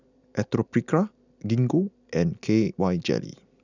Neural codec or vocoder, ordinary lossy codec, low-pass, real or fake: none; none; 7.2 kHz; real